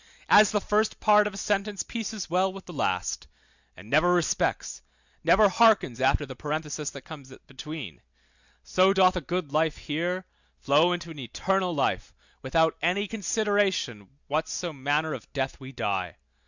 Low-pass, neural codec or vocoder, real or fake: 7.2 kHz; none; real